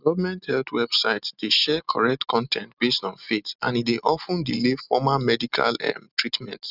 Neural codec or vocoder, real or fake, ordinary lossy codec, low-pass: none; real; none; 5.4 kHz